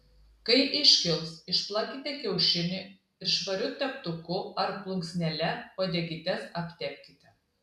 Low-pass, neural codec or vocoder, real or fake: 14.4 kHz; none; real